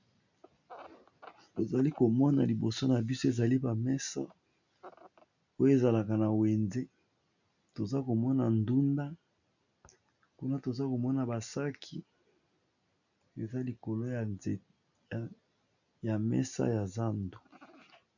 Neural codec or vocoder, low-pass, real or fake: none; 7.2 kHz; real